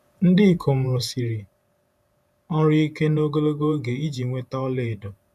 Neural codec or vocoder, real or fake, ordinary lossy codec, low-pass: vocoder, 48 kHz, 128 mel bands, Vocos; fake; none; 14.4 kHz